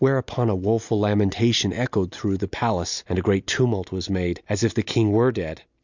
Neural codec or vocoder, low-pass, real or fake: none; 7.2 kHz; real